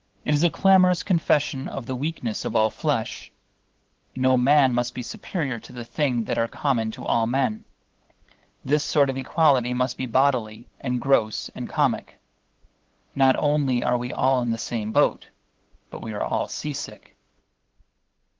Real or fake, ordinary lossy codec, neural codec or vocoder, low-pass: fake; Opus, 16 kbps; codec, 16 kHz, 8 kbps, FunCodec, trained on LibriTTS, 25 frames a second; 7.2 kHz